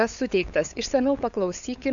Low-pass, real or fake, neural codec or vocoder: 7.2 kHz; fake; codec, 16 kHz, 8 kbps, FunCodec, trained on LibriTTS, 25 frames a second